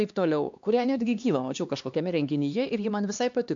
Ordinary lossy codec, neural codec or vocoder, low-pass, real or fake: MP3, 64 kbps; codec, 16 kHz, 2 kbps, X-Codec, HuBERT features, trained on LibriSpeech; 7.2 kHz; fake